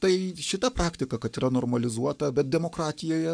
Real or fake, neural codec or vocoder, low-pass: fake; codec, 44.1 kHz, 7.8 kbps, Pupu-Codec; 9.9 kHz